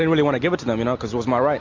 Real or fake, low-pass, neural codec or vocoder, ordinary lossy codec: real; 7.2 kHz; none; MP3, 48 kbps